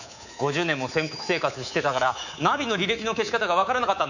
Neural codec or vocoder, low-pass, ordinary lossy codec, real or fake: codec, 24 kHz, 3.1 kbps, DualCodec; 7.2 kHz; none; fake